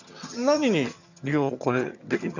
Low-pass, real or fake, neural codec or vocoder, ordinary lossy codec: 7.2 kHz; fake; vocoder, 22.05 kHz, 80 mel bands, HiFi-GAN; none